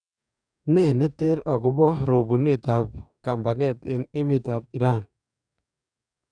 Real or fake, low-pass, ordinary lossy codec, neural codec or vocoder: fake; 9.9 kHz; none; codec, 44.1 kHz, 2.6 kbps, DAC